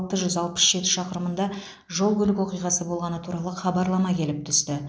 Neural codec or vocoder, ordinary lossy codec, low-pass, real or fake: none; none; none; real